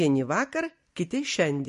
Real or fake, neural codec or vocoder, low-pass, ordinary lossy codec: real; none; 14.4 kHz; MP3, 48 kbps